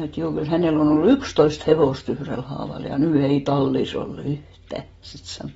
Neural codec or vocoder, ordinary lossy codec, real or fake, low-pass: none; AAC, 24 kbps; real; 19.8 kHz